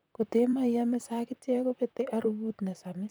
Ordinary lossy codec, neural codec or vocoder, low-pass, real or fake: none; vocoder, 44.1 kHz, 128 mel bands, Pupu-Vocoder; none; fake